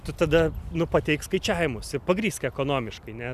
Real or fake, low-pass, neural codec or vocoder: real; 14.4 kHz; none